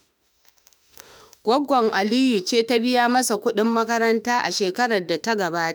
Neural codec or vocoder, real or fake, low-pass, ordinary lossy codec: autoencoder, 48 kHz, 32 numbers a frame, DAC-VAE, trained on Japanese speech; fake; none; none